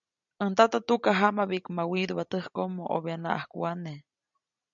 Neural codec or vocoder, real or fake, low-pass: none; real; 7.2 kHz